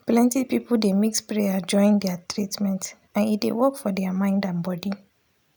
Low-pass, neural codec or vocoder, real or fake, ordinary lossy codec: none; none; real; none